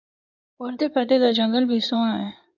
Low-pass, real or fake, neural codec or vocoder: 7.2 kHz; fake; codec, 16 kHz in and 24 kHz out, 2.2 kbps, FireRedTTS-2 codec